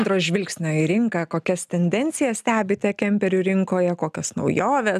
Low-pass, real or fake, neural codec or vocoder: 14.4 kHz; real; none